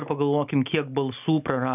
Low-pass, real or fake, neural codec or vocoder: 3.6 kHz; real; none